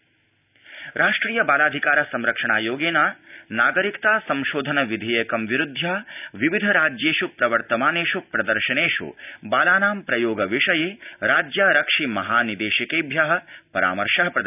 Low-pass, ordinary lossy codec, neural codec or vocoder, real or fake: 3.6 kHz; none; none; real